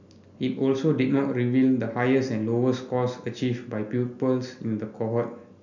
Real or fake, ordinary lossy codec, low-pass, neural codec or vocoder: real; none; 7.2 kHz; none